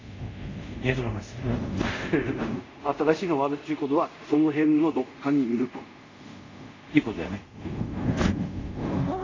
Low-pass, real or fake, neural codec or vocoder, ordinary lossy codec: 7.2 kHz; fake; codec, 24 kHz, 0.5 kbps, DualCodec; AAC, 32 kbps